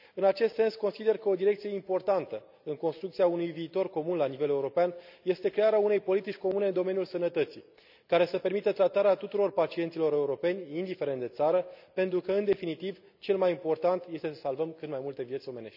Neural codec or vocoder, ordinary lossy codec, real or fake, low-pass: none; none; real; 5.4 kHz